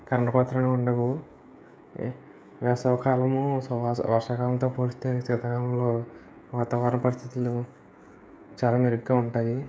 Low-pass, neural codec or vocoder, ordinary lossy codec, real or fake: none; codec, 16 kHz, 16 kbps, FreqCodec, smaller model; none; fake